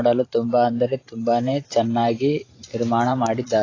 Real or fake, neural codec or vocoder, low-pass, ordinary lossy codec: fake; vocoder, 44.1 kHz, 128 mel bands every 512 samples, BigVGAN v2; 7.2 kHz; AAC, 32 kbps